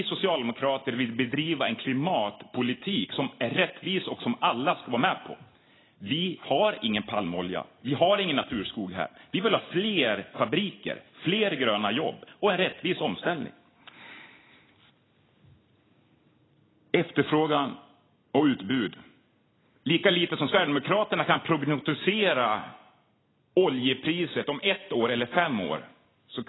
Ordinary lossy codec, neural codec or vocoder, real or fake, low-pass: AAC, 16 kbps; none; real; 7.2 kHz